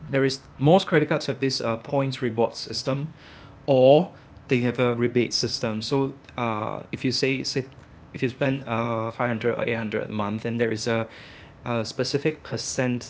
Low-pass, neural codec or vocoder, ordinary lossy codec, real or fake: none; codec, 16 kHz, 0.8 kbps, ZipCodec; none; fake